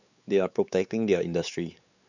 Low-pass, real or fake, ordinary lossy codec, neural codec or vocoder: 7.2 kHz; fake; none; codec, 16 kHz, 4 kbps, X-Codec, WavLM features, trained on Multilingual LibriSpeech